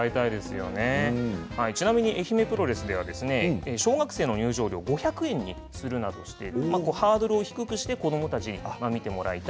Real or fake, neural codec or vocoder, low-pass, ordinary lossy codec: real; none; none; none